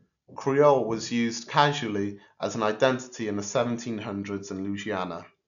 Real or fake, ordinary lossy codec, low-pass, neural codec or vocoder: real; none; 7.2 kHz; none